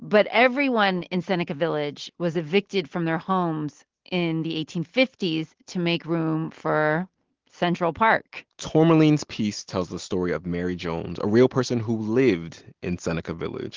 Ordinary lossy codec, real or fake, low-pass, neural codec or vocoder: Opus, 16 kbps; real; 7.2 kHz; none